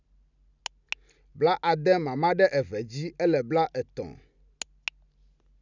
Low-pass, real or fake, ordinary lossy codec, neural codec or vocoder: 7.2 kHz; real; none; none